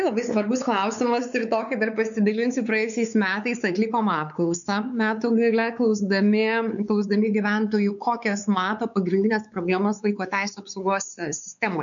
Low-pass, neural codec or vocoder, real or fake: 7.2 kHz; codec, 16 kHz, 4 kbps, X-Codec, WavLM features, trained on Multilingual LibriSpeech; fake